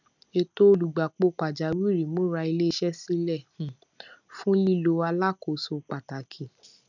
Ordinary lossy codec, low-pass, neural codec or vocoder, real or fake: none; 7.2 kHz; none; real